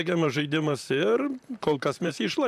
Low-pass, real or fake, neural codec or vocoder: 14.4 kHz; fake; vocoder, 44.1 kHz, 128 mel bands, Pupu-Vocoder